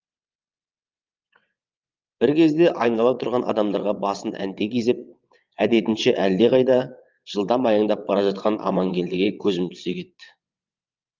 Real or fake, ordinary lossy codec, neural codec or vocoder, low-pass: fake; Opus, 24 kbps; vocoder, 22.05 kHz, 80 mel bands, WaveNeXt; 7.2 kHz